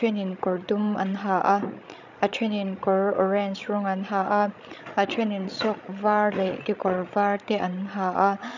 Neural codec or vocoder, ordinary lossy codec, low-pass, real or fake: codec, 16 kHz, 16 kbps, FreqCodec, larger model; none; 7.2 kHz; fake